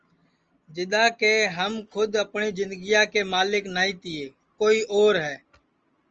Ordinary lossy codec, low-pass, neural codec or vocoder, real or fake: Opus, 24 kbps; 7.2 kHz; none; real